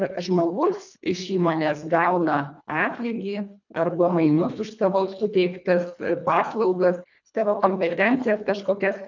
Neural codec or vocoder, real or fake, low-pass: codec, 24 kHz, 1.5 kbps, HILCodec; fake; 7.2 kHz